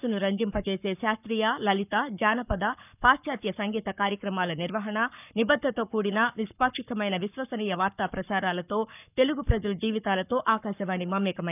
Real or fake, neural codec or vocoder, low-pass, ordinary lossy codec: fake; codec, 44.1 kHz, 7.8 kbps, Pupu-Codec; 3.6 kHz; none